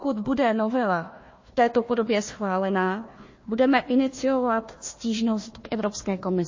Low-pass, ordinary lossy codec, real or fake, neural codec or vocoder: 7.2 kHz; MP3, 32 kbps; fake; codec, 16 kHz, 1 kbps, FunCodec, trained on Chinese and English, 50 frames a second